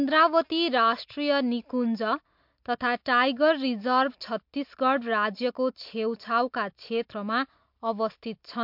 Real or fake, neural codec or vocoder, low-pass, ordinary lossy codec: real; none; 5.4 kHz; MP3, 48 kbps